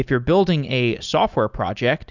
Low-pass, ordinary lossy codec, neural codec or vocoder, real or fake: 7.2 kHz; Opus, 64 kbps; none; real